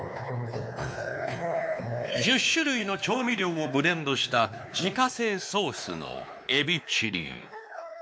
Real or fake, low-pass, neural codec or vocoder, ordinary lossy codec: fake; none; codec, 16 kHz, 2 kbps, X-Codec, WavLM features, trained on Multilingual LibriSpeech; none